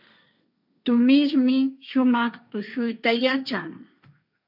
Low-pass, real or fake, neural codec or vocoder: 5.4 kHz; fake; codec, 16 kHz, 1.1 kbps, Voila-Tokenizer